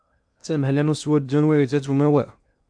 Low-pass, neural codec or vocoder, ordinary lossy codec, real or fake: 9.9 kHz; codec, 16 kHz in and 24 kHz out, 0.8 kbps, FocalCodec, streaming, 65536 codes; AAC, 64 kbps; fake